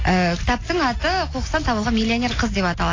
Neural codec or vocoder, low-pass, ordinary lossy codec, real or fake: none; 7.2 kHz; AAC, 32 kbps; real